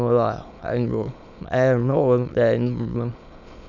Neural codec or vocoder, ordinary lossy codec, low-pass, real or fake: autoencoder, 22.05 kHz, a latent of 192 numbers a frame, VITS, trained on many speakers; none; 7.2 kHz; fake